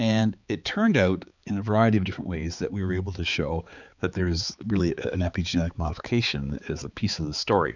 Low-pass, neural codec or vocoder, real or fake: 7.2 kHz; codec, 16 kHz, 4 kbps, X-Codec, HuBERT features, trained on balanced general audio; fake